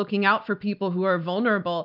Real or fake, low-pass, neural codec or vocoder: real; 5.4 kHz; none